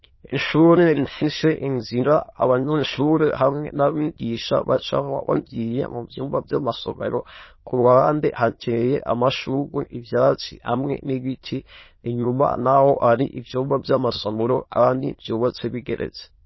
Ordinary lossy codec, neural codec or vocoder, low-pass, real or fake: MP3, 24 kbps; autoencoder, 22.05 kHz, a latent of 192 numbers a frame, VITS, trained on many speakers; 7.2 kHz; fake